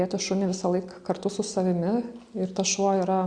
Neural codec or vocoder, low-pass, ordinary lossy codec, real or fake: none; 9.9 kHz; Opus, 64 kbps; real